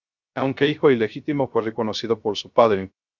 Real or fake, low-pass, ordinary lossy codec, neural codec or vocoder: fake; 7.2 kHz; Opus, 64 kbps; codec, 16 kHz, 0.3 kbps, FocalCodec